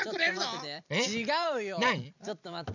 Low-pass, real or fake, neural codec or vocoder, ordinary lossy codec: 7.2 kHz; fake; vocoder, 44.1 kHz, 128 mel bands every 256 samples, BigVGAN v2; none